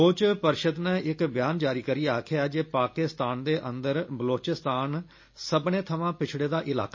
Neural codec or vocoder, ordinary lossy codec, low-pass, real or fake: none; MP3, 32 kbps; 7.2 kHz; real